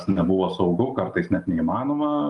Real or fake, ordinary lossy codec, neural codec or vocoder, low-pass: real; Opus, 32 kbps; none; 10.8 kHz